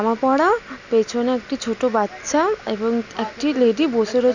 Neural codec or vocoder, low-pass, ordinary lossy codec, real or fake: none; 7.2 kHz; none; real